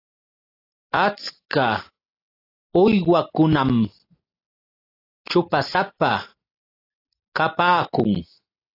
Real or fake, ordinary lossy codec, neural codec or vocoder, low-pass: real; AAC, 32 kbps; none; 5.4 kHz